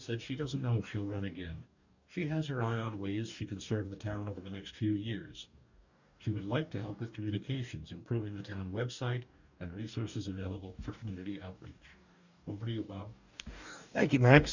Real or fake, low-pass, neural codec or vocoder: fake; 7.2 kHz; codec, 44.1 kHz, 2.6 kbps, DAC